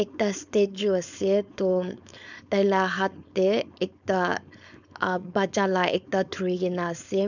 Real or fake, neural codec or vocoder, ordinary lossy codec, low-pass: fake; codec, 16 kHz, 4.8 kbps, FACodec; none; 7.2 kHz